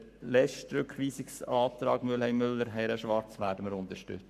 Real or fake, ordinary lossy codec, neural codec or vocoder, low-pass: fake; none; codec, 44.1 kHz, 7.8 kbps, Pupu-Codec; 14.4 kHz